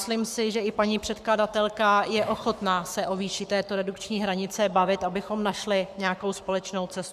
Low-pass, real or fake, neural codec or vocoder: 14.4 kHz; fake; codec, 44.1 kHz, 7.8 kbps, Pupu-Codec